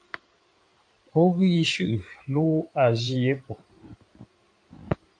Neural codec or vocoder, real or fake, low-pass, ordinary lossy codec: codec, 16 kHz in and 24 kHz out, 2.2 kbps, FireRedTTS-2 codec; fake; 9.9 kHz; AAC, 64 kbps